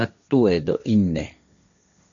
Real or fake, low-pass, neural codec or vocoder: fake; 7.2 kHz; codec, 16 kHz, 1.1 kbps, Voila-Tokenizer